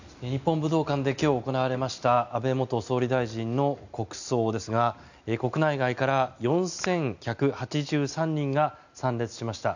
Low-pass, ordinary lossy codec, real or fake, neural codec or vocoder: 7.2 kHz; none; real; none